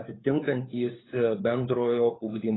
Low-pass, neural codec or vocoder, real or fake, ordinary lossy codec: 7.2 kHz; codec, 16 kHz, 4 kbps, FunCodec, trained on LibriTTS, 50 frames a second; fake; AAC, 16 kbps